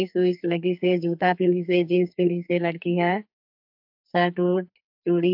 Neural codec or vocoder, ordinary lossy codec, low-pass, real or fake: codec, 44.1 kHz, 2.6 kbps, SNAC; AAC, 48 kbps; 5.4 kHz; fake